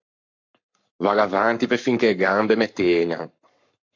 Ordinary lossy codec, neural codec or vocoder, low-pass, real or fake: MP3, 64 kbps; codec, 44.1 kHz, 7.8 kbps, Pupu-Codec; 7.2 kHz; fake